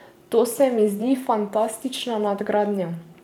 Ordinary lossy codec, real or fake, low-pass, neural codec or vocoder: none; fake; 19.8 kHz; vocoder, 44.1 kHz, 128 mel bands every 512 samples, BigVGAN v2